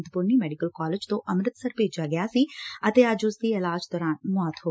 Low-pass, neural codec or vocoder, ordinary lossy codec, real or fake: none; none; none; real